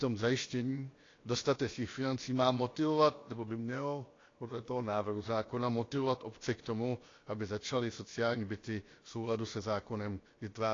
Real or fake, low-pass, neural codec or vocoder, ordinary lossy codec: fake; 7.2 kHz; codec, 16 kHz, about 1 kbps, DyCAST, with the encoder's durations; AAC, 32 kbps